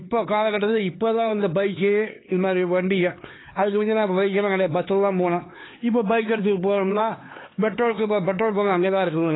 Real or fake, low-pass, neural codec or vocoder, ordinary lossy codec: fake; 7.2 kHz; codec, 16 kHz, 4 kbps, X-Codec, HuBERT features, trained on LibriSpeech; AAC, 16 kbps